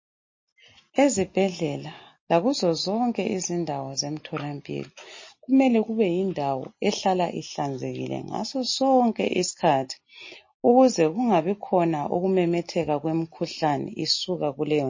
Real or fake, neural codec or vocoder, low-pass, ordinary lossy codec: real; none; 7.2 kHz; MP3, 32 kbps